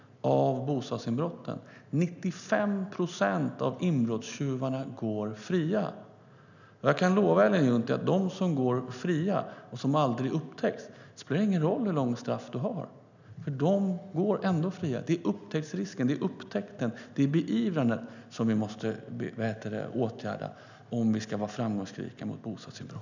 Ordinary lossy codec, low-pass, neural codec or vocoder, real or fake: none; 7.2 kHz; none; real